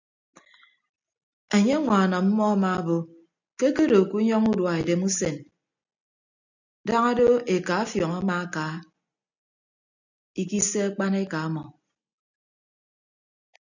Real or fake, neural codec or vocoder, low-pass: real; none; 7.2 kHz